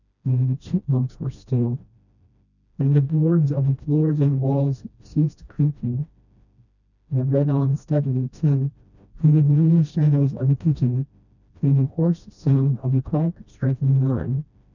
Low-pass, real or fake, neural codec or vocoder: 7.2 kHz; fake; codec, 16 kHz, 1 kbps, FreqCodec, smaller model